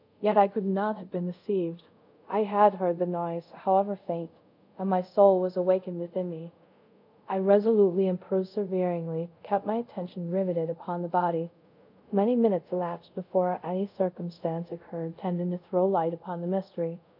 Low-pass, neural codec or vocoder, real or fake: 5.4 kHz; codec, 24 kHz, 0.5 kbps, DualCodec; fake